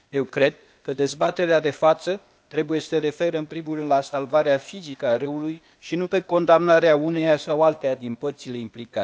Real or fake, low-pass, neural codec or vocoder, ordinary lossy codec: fake; none; codec, 16 kHz, 0.8 kbps, ZipCodec; none